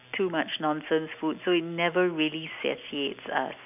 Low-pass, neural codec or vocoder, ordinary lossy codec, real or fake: 3.6 kHz; none; none; real